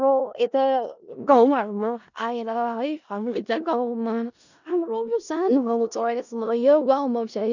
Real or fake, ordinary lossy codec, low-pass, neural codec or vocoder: fake; none; 7.2 kHz; codec, 16 kHz in and 24 kHz out, 0.4 kbps, LongCat-Audio-Codec, four codebook decoder